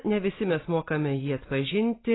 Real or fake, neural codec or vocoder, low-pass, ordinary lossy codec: real; none; 7.2 kHz; AAC, 16 kbps